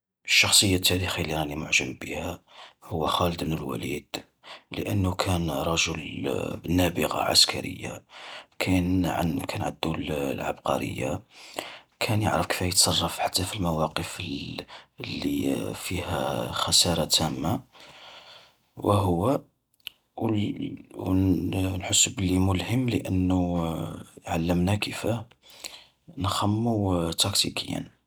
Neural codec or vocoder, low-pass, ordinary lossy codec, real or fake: none; none; none; real